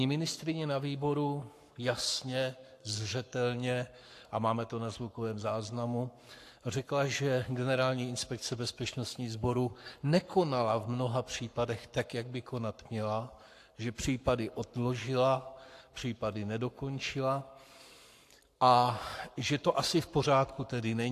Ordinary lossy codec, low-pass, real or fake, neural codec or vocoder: AAC, 64 kbps; 14.4 kHz; fake; codec, 44.1 kHz, 7.8 kbps, Pupu-Codec